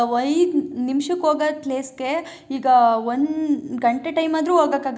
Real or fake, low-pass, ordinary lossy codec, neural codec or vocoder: real; none; none; none